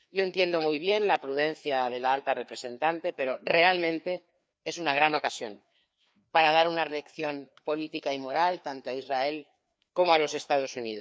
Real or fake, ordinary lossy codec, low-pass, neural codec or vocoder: fake; none; none; codec, 16 kHz, 2 kbps, FreqCodec, larger model